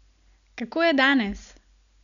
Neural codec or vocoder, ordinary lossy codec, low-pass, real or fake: none; none; 7.2 kHz; real